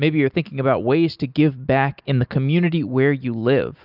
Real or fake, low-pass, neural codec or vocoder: real; 5.4 kHz; none